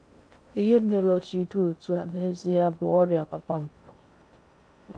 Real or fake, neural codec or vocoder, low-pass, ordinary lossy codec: fake; codec, 16 kHz in and 24 kHz out, 0.6 kbps, FocalCodec, streaming, 2048 codes; 9.9 kHz; AAC, 64 kbps